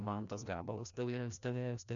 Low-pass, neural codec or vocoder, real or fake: 7.2 kHz; codec, 16 kHz, 1 kbps, FreqCodec, larger model; fake